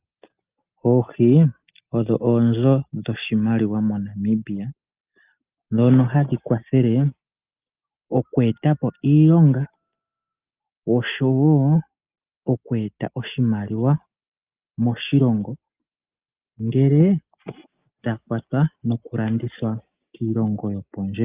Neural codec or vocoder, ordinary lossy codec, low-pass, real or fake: none; Opus, 24 kbps; 3.6 kHz; real